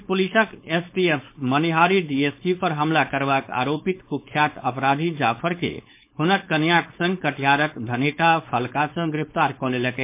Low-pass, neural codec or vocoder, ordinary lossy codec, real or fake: 3.6 kHz; codec, 16 kHz, 4.8 kbps, FACodec; MP3, 24 kbps; fake